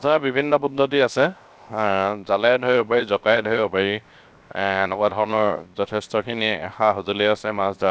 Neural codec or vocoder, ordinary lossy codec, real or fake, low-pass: codec, 16 kHz, 0.7 kbps, FocalCodec; none; fake; none